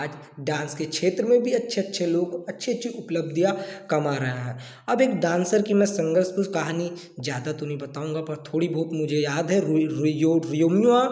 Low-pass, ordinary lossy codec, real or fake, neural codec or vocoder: none; none; real; none